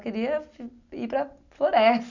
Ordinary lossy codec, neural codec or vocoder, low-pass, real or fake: none; none; 7.2 kHz; real